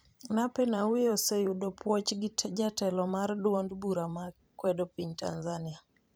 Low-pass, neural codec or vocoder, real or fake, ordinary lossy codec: none; vocoder, 44.1 kHz, 128 mel bands every 512 samples, BigVGAN v2; fake; none